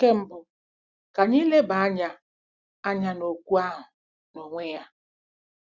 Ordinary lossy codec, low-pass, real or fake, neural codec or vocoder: Opus, 64 kbps; 7.2 kHz; real; none